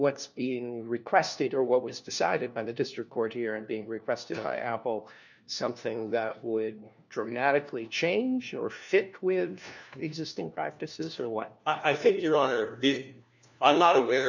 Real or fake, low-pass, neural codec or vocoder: fake; 7.2 kHz; codec, 16 kHz, 1 kbps, FunCodec, trained on LibriTTS, 50 frames a second